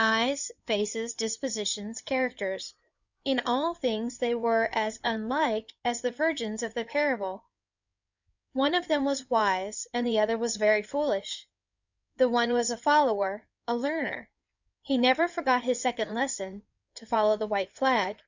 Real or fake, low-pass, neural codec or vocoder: fake; 7.2 kHz; codec, 16 kHz in and 24 kHz out, 2.2 kbps, FireRedTTS-2 codec